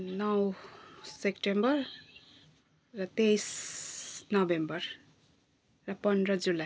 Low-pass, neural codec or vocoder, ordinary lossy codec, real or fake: none; none; none; real